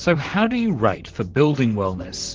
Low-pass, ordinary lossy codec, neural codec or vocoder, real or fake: 7.2 kHz; Opus, 16 kbps; vocoder, 44.1 kHz, 128 mel bands, Pupu-Vocoder; fake